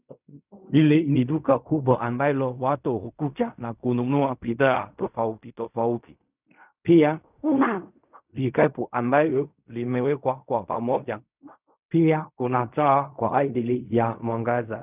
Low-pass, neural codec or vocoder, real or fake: 3.6 kHz; codec, 16 kHz in and 24 kHz out, 0.4 kbps, LongCat-Audio-Codec, fine tuned four codebook decoder; fake